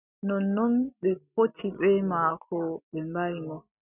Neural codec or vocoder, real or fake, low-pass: none; real; 3.6 kHz